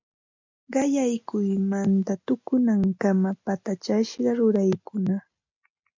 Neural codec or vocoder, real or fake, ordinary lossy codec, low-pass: none; real; MP3, 64 kbps; 7.2 kHz